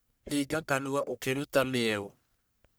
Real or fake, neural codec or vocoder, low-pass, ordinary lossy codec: fake; codec, 44.1 kHz, 1.7 kbps, Pupu-Codec; none; none